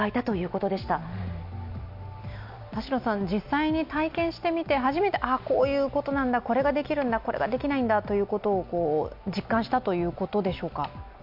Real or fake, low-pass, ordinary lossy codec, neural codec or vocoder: real; 5.4 kHz; MP3, 48 kbps; none